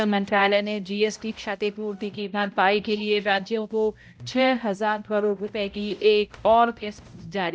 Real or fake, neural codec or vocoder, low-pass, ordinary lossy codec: fake; codec, 16 kHz, 0.5 kbps, X-Codec, HuBERT features, trained on balanced general audio; none; none